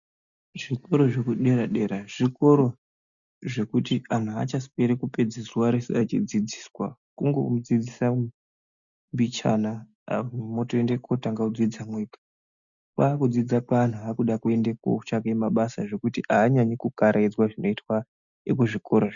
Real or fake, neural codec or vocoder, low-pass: real; none; 7.2 kHz